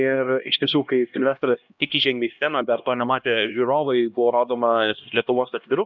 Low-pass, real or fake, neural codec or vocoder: 7.2 kHz; fake; codec, 16 kHz, 1 kbps, X-Codec, HuBERT features, trained on LibriSpeech